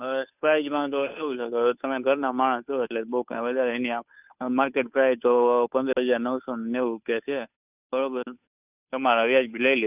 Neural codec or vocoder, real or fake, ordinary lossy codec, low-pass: codec, 16 kHz, 2 kbps, FunCodec, trained on Chinese and English, 25 frames a second; fake; none; 3.6 kHz